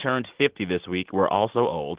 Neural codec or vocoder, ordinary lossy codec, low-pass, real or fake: none; Opus, 16 kbps; 3.6 kHz; real